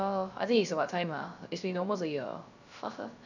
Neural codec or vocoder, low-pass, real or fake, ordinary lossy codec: codec, 16 kHz, 0.3 kbps, FocalCodec; 7.2 kHz; fake; none